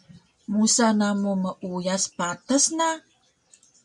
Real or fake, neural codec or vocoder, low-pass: real; none; 10.8 kHz